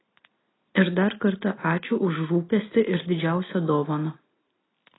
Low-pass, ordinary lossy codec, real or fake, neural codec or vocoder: 7.2 kHz; AAC, 16 kbps; real; none